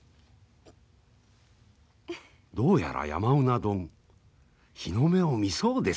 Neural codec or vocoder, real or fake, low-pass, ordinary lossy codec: none; real; none; none